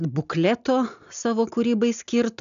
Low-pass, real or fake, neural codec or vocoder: 7.2 kHz; real; none